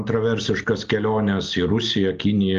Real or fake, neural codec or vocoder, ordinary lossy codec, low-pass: real; none; Opus, 24 kbps; 7.2 kHz